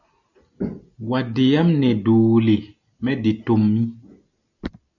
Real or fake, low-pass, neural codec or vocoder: real; 7.2 kHz; none